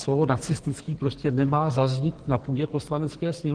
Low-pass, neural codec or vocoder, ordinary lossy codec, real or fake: 9.9 kHz; codec, 44.1 kHz, 2.6 kbps, SNAC; Opus, 16 kbps; fake